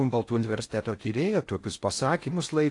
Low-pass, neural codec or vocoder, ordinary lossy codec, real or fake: 10.8 kHz; codec, 16 kHz in and 24 kHz out, 0.6 kbps, FocalCodec, streaming, 4096 codes; AAC, 48 kbps; fake